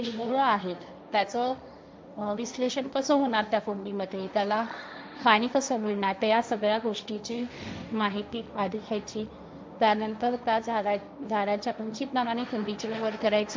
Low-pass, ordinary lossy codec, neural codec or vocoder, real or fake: none; none; codec, 16 kHz, 1.1 kbps, Voila-Tokenizer; fake